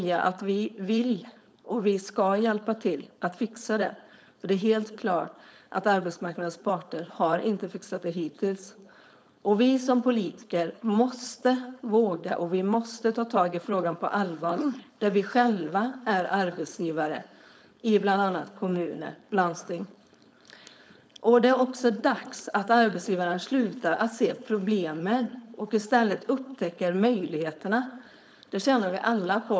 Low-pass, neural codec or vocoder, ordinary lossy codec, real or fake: none; codec, 16 kHz, 4.8 kbps, FACodec; none; fake